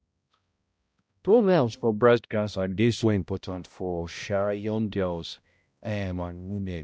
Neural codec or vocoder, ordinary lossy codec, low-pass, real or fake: codec, 16 kHz, 0.5 kbps, X-Codec, HuBERT features, trained on balanced general audio; none; none; fake